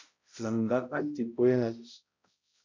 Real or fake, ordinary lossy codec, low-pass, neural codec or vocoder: fake; MP3, 64 kbps; 7.2 kHz; codec, 16 kHz, 0.5 kbps, X-Codec, HuBERT features, trained on balanced general audio